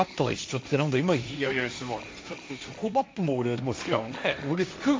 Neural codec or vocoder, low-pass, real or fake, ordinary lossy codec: codec, 16 kHz, 1.1 kbps, Voila-Tokenizer; none; fake; none